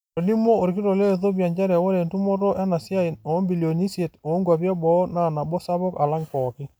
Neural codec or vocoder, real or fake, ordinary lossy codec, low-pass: none; real; none; none